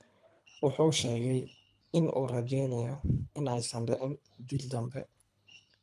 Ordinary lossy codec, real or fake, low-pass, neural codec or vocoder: none; fake; none; codec, 24 kHz, 3 kbps, HILCodec